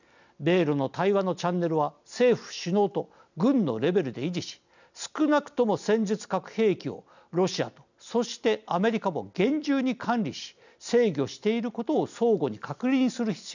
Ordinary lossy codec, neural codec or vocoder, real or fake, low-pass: none; none; real; 7.2 kHz